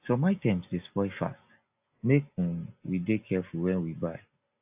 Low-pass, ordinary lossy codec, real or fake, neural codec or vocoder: 3.6 kHz; AAC, 32 kbps; real; none